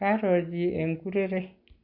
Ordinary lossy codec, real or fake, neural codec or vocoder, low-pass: none; real; none; 5.4 kHz